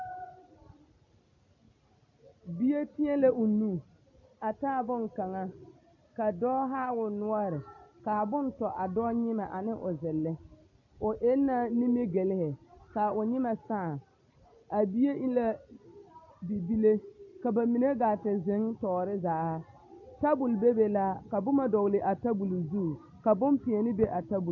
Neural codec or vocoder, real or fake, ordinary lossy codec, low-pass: none; real; AAC, 48 kbps; 7.2 kHz